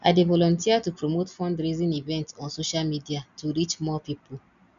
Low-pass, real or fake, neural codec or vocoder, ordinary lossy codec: 7.2 kHz; real; none; none